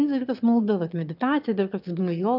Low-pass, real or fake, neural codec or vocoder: 5.4 kHz; fake; autoencoder, 22.05 kHz, a latent of 192 numbers a frame, VITS, trained on one speaker